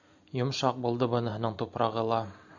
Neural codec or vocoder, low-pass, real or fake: none; 7.2 kHz; real